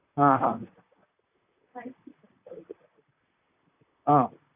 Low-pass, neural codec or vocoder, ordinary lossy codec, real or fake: 3.6 kHz; vocoder, 44.1 kHz, 128 mel bands, Pupu-Vocoder; AAC, 32 kbps; fake